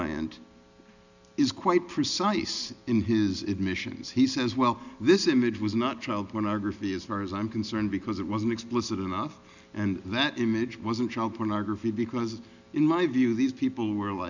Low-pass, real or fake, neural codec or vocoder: 7.2 kHz; real; none